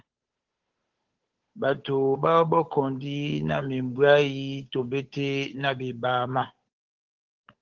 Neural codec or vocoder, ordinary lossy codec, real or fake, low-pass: codec, 16 kHz, 8 kbps, FunCodec, trained on Chinese and English, 25 frames a second; Opus, 16 kbps; fake; 7.2 kHz